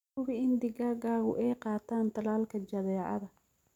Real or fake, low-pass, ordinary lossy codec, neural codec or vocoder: real; 19.8 kHz; none; none